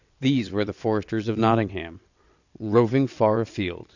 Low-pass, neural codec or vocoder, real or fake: 7.2 kHz; vocoder, 22.05 kHz, 80 mel bands, WaveNeXt; fake